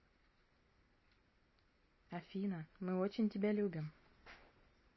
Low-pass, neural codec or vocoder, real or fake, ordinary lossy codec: 7.2 kHz; none; real; MP3, 24 kbps